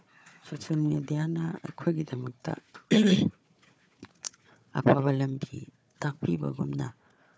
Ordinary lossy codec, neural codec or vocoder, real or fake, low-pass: none; codec, 16 kHz, 4 kbps, FunCodec, trained on Chinese and English, 50 frames a second; fake; none